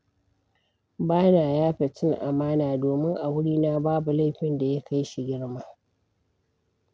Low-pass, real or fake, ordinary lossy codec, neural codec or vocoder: none; real; none; none